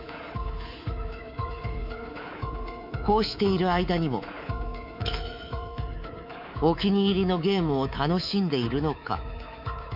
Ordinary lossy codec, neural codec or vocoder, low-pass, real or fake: none; codec, 24 kHz, 3.1 kbps, DualCodec; 5.4 kHz; fake